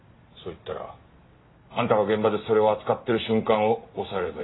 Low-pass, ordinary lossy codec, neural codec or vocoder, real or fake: 7.2 kHz; AAC, 16 kbps; none; real